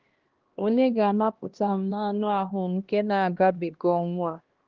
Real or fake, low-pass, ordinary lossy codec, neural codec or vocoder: fake; 7.2 kHz; Opus, 16 kbps; codec, 16 kHz, 1 kbps, X-Codec, HuBERT features, trained on LibriSpeech